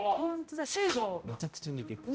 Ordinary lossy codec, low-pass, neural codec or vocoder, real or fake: none; none; codec, 16 kHz, 0.5 kbps, X-Codec, HuBERT features, trained on balanced general audio; fake